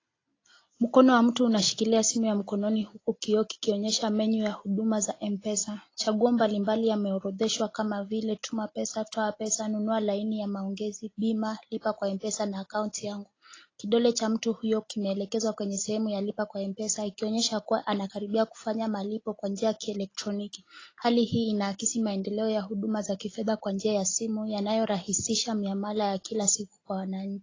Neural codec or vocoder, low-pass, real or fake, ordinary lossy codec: none; 7.2 kHz; real; AAC, 32 kbps